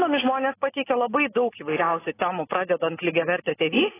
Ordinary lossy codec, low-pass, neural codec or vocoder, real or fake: AAC, 16 kbps; 3.6 kHz; none; real